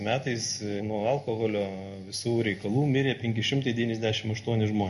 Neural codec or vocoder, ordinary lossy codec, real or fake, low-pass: none; MP3, 48 kbps; real; 14.4 kHz